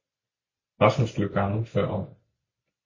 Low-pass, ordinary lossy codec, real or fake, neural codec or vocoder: 7.2 kHz; MP3, 32 kbps; real; none